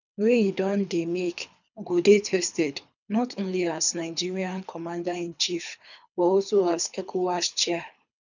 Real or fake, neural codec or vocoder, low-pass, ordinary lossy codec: fake; codec, 24 kHz, 3 kbps, HILCodec; 7.2 kHz; none